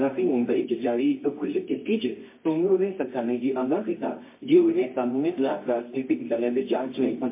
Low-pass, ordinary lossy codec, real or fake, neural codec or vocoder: 3.6 kHz; MP3, 24 kbps; fake; codec, 24 kHz, 0.9 kbps, WavTokenizer, medium music audio release